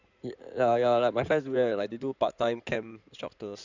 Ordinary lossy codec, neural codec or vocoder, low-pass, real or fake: none; codec, 16 kHz in and 24 kHz out, 2.2 kbps, FireRedTTS-2 codec; 7.2 kHz; fake